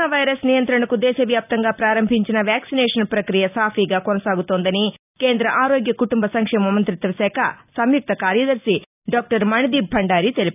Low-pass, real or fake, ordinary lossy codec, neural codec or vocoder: 3.6 kHz; real; none; none